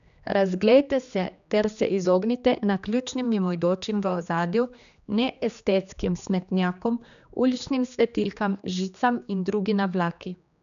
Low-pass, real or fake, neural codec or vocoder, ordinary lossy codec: 7.2 kHz; fake; codec, 16 kHz, 2 kbps, X-Codec, HuBERT features, trained on general audio; none